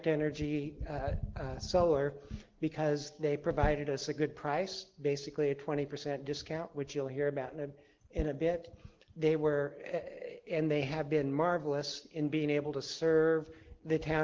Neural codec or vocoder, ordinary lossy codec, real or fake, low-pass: none; Opus, 16 kbps; real; 7.2 kHz